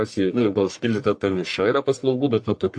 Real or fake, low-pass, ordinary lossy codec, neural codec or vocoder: fake; 9.9 kHz; AAC, 64 kbps; codec, 44.1 kHz, 1.7 kbps, Pupu-Codec